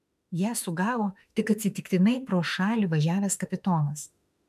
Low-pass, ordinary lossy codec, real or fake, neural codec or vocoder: 14.4 kHz; MP3, 96 kbps; fake; autoencoder, 48 kHz, 32 numbers a frame, DAC-VAE, trained on Japanese speech